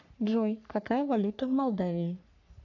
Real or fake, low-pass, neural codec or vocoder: fake; 7.2 kHz; codec, 44.1 kHz, 3.4 kbps, Pupu-Codec